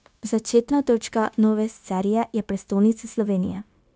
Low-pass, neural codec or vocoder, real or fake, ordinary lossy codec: none; codec, 16 kHz, 0.9 kbps, LongCat-Audio-Codec; fake; none